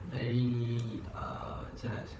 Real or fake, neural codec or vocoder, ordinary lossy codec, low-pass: fake; codec, 16 kHz, 4 kbps, FunCodec, trained on Chinese and English, 50 frames a second; none; none